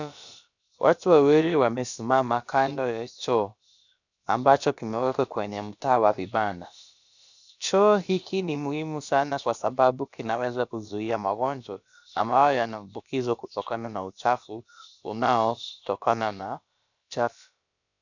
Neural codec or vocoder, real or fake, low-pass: codec, 16 kHz, about 1 kbps, DyCAST, with the encoder's durations; fake; 7.2 kHz